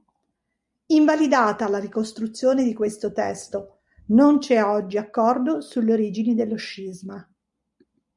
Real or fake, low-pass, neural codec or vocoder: real; 10.8 kHz; none